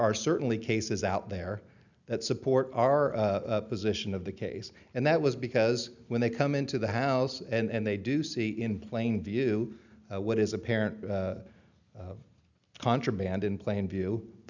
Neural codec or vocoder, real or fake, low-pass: none; real; 7.2 kHz